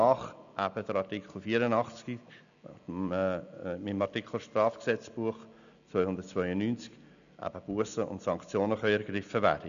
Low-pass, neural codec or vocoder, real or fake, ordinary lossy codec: 7.2 kHz; none; real; none